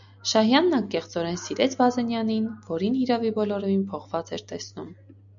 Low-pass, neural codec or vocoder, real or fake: 7.2 kHz; none; real